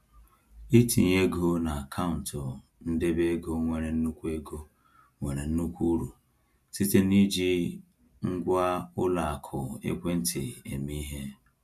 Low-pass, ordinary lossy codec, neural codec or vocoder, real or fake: 14.4 kHz; none; none; real